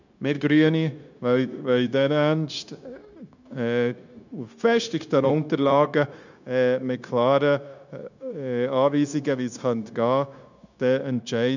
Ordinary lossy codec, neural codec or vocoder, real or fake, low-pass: none; codec, 16 kHz, 0.9 kbps, LongCat-Audio-Codec; fake; 7.2 kHz